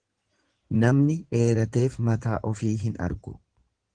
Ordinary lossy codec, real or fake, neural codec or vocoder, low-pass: Opus, 16 kbps; fake; codec, 16 kHz in and 24 kHz out, 2.2 kbps, FireRedTTS-2 codec; 9.9 kHz